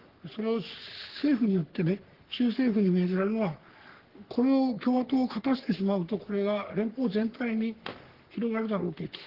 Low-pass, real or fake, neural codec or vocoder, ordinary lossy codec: 5.4 kHz; fake; codec, 44.1 kHz, 3.4 kbps, Pupu-Codec; Opus, 16 kbps